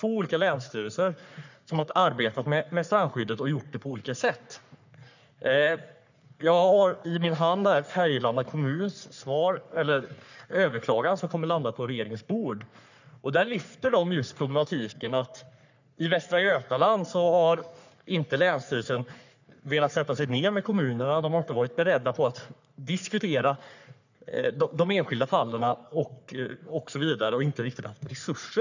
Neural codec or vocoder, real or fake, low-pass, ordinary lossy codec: codec, 44.1 kHz, 3.4 kbps, Pupu-Codec; fake; 7.2 kHz; none